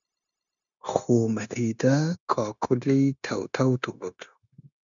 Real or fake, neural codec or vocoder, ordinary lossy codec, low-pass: fake; codec, 16 kHz, 0.9 kbps, LongCat-Audio-Codec; MP3, 96 kbps; 7.2 kHz